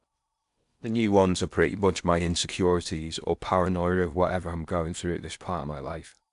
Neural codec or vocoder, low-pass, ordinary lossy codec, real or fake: codec, 16 kHz in and 24 kHz out, 0.8 kbps, FocalCodec, streaming, 65536 codes; 10.8 kHz; none; fake